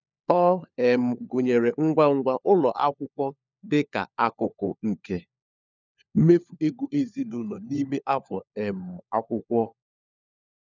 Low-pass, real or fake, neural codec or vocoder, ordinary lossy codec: 7.2 kHz; fake; codec, 16 kHz, 4 kbps, FunCodec, trained on LibriTTS, 50 frames a second; none